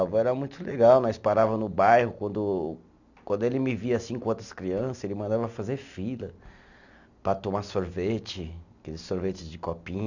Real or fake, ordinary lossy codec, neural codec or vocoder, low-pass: real; none; none; 7.2 kHz